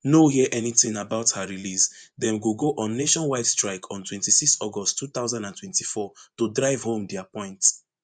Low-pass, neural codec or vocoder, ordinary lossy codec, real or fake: 9.9 kHz; vocoder, 24 kHz, 100 mel bands, Vocos; none; fake